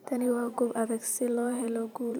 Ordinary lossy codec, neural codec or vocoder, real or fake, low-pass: none; vocoder, 44.1 kHz, 128 mel bands every 512 samples, BigVGAN v2; fake; none